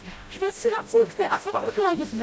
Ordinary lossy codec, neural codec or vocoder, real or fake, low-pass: none; codec, 16 kHz, 0.5 kbps, FreqCodec, smaller model; fake; none